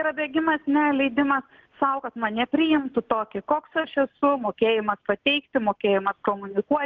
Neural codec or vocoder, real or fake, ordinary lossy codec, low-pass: none; real; Opus, 16 kbps; 7.2 kHz